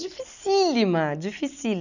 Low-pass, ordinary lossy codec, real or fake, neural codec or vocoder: 7.2 kHz; none; real; none